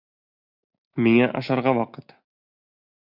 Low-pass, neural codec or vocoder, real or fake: 5.4 kHz; none; real